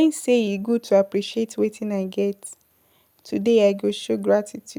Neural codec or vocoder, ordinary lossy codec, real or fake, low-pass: none; none; real; 19.8 kHz